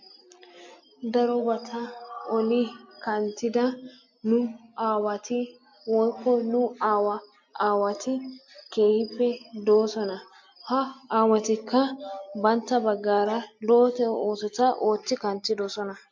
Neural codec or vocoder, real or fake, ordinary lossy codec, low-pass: none; real; MP3, 48 kbps; 7.2 kHz